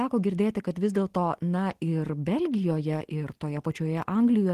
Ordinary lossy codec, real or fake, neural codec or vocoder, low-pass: Opus, 16 kbps; real; none; 14.4 kHz